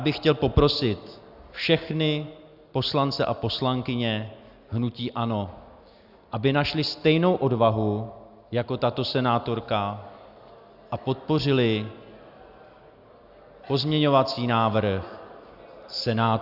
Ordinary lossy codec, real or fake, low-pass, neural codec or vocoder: Opus, 64 kbps; real; 5.4 kHz; none